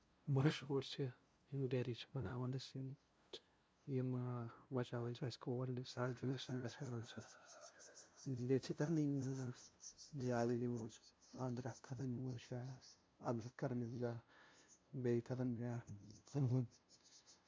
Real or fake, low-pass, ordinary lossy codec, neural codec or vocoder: fake; none; none; codec, 16 kHz, 0.5 kbps, FunCodec, trained on LibriTTS, 25 frames a second